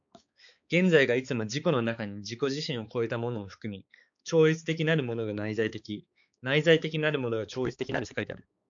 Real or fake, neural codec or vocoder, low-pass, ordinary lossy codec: fake; codec, 16 kHz, 4 kbps, X-Codec, HuBERT features, trained on balanced general audio; 7.2 kHz; AAC, 64 kbps